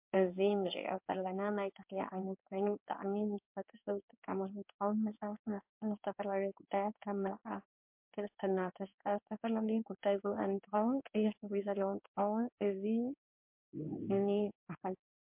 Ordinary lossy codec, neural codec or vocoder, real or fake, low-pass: MP3, 32 kbps; codec, 16 kHz in and 24 kHz out, 1 kbps, XY-Tokenizer; fake; 3.6 kHz